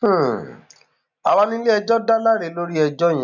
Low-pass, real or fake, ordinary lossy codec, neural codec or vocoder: 7.2 kHz; real; none; none